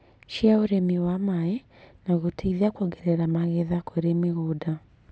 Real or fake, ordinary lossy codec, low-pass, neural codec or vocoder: real; none; none; none